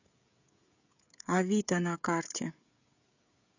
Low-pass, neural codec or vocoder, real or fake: 7.2 kHz; codec, 16 kHz, 16 kbps, FreqCodec, smaller model; fake